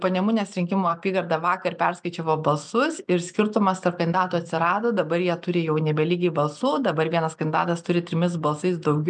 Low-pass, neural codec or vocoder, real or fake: 9.9 kHz; none; real